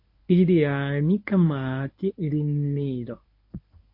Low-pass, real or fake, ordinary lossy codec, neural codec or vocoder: 5.4 kHz; fake; MP3, 32 kbps; codec, 24 kHz, 0.9 kbps, WavTokenizer, medium speech release version 1